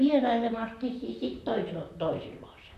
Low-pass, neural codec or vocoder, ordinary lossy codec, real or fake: 14.4 kHz; vocoder, 44.1 kHz, 128 mel bands, Pupu-Vocoder; none; fake